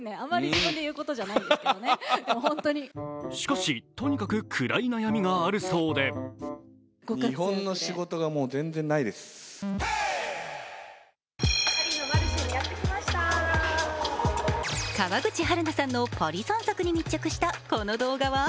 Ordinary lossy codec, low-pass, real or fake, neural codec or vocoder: none; none; real; none